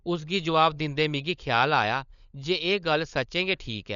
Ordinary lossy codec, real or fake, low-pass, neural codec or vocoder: none; fake; 7.2 kHz; codec, 16 kHz, 16 kbps, FunCodec, trained on LibriTTS, 50 frames a second